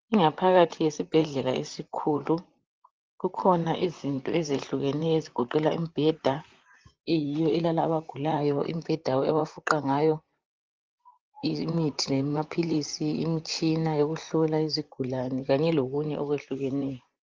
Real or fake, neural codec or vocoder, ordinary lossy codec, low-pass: fake; vocoder, 44.1 kHz, 128 mel bands, Pupu-Vocoder; Opus, 32 kbps; 7.2 kHz